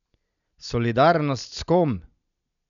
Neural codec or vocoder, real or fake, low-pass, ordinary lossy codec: none; real; 7.2 kHz; none